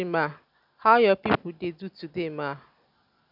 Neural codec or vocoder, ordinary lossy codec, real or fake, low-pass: none; none; real; 5.4 kHz